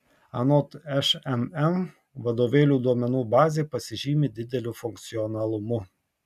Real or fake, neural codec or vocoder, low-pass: real; none; 14.4 kHz